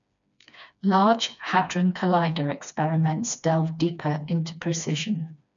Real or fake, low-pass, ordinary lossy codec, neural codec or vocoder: fake; 7.2 kHz; none; codec, 16 kHz, 2 kbps, FreqCodec, smaller model